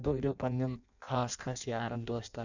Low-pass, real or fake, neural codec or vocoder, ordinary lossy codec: 7.2 kHz; fake; codec, 16 kHz in and 24 kHz out, 0.6 kbps, FireRedTTS-2 codec; none